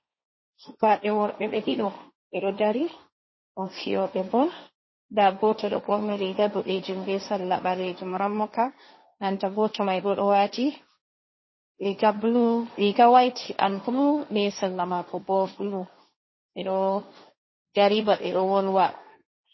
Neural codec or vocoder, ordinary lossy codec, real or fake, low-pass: codec, 16 kHz, 1.1 kbps, Voila-Tokenizer; MP3, 24 kbps; fake; 7.2 kHz